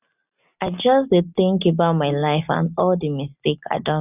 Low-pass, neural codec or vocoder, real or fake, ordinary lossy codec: 3.6 kHz; none; real; none